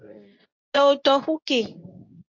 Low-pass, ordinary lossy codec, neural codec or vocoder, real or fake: 7.2 kHz; MP3, 48 kbps; codec, 24 kHz, 0.9 kbps, WavTokenizer, medium speech release version 2; fake